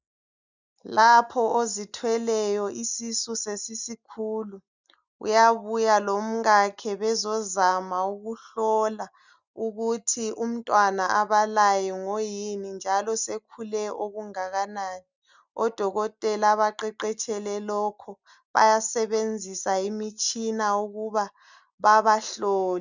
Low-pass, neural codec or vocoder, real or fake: 7.2 kHz; none; real